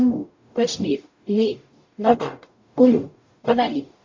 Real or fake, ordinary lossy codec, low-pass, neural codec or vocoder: fake; MP3, 48 kbps; 7.2 kHz; codec, 44.1 kHz, 0.9 kbps, DAC